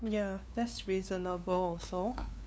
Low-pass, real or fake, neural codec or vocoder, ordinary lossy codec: none; fake; codec, 16 kHz, 2 kbps, FunCodec, trained on LibriTTS, 25 frames a second; none